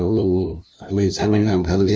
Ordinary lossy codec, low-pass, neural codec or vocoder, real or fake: none; none; codec, 16 kHz, 0.5 kbps, FunCodec, trained on LibriTTS, 25 frames a second; fake